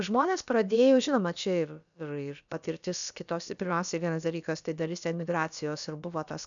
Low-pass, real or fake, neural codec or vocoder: 7.2 kHz; fake; codec, 16 kHz, about 1 kbps, DyCAST, with the encoder's durations